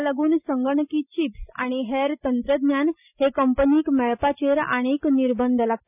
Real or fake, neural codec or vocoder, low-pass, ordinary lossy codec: real; none; 3.6 kHz; none